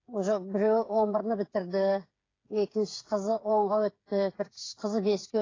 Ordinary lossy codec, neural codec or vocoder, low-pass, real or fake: AAC, 32 kbps; codec, 16 kHz, 8 kbps, FreqCodec, smaller model; 7.2 kHz; fake